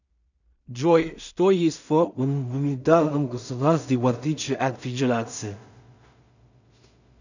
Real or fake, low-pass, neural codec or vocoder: fake; 7.2 kHz; codec, 16 kHz in and 24 kHz out, 0.4 kbps, LongCat-Audio-Codec, two codebook decoder